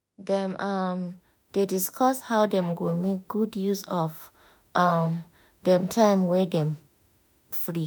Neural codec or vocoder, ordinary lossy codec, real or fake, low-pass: autoencoder, 48 kHz, 32 numbers a frame, DAC-VAE, trained on Japanese speech; none; fake; none